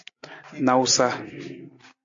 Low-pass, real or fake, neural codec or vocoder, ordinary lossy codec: 7.2 kHz; real; none; AAC, 64 kbps